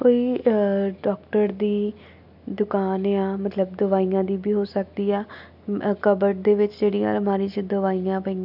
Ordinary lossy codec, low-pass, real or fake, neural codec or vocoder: none; 5.4 kHz; real; none